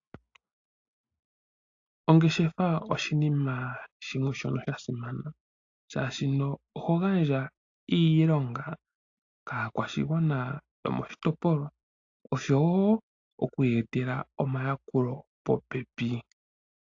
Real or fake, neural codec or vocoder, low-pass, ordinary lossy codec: real; none; 7.2 kHz; AAC, 48 kbps